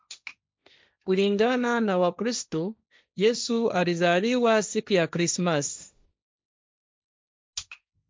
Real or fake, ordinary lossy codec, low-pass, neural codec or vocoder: fake; none; none; codec, 16 kHz, 1.1 kbps, Voila-Tokenizer